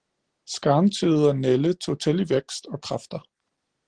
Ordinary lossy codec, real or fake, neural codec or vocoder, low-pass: Opus, 16 kbps; real; none; 9.9 kHz